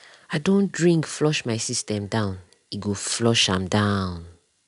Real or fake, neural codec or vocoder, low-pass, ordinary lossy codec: real; none; 10.8 kHz; none